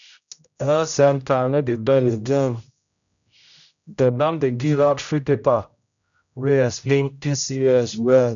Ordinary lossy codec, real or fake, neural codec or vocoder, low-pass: none; fake; codec, 16 kHz, 0.5 kbps, X-Codec, HuBERT features, trained on general audio; 7.2 kHz